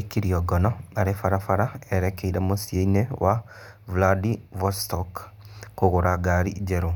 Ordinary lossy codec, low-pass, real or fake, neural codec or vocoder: none; 19.8 kHz; real; none